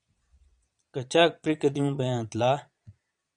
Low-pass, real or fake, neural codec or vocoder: 9.9 kHz; fake; vocoder, 22.05 kHz, 80 mel bands, Vocos